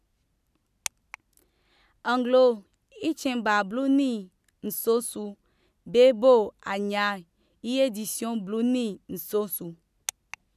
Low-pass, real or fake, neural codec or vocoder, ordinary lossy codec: 14.4 kHz; real; none; none